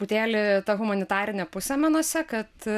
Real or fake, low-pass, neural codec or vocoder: real; 14.4 kHz; none